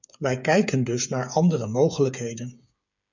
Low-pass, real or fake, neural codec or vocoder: 7.2 kHz; fake; codec, 16 kHz, 8 kbps, FreqCodec, smaller model